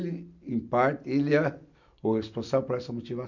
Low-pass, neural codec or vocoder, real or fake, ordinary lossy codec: 7.2 kHz; none; real; none